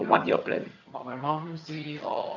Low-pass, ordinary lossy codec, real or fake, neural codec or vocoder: 7.2 kHz; none; fake; vocoder, 22.05 kHz, 80 mel bands, HiFi-GAN